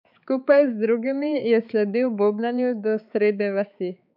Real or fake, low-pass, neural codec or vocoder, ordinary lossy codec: fake; 5.4 kHz; codec, 16 kHz, 4 kbps, X-Codec, HuBERT features, trained on balanced general audio; none